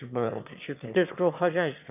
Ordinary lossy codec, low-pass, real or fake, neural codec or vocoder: none; 3.6 kHz; fake; autoencoder, 22.05 kHz, a latent of 192 numbers a frame, VITS, trained on one speaker